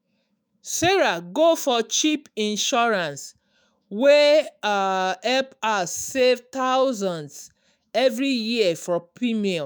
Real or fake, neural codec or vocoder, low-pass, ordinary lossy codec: fake; autoencoder, 48 kHz, 128 numbers a frame, DAC-VAE, trained on Japanese speech; none; none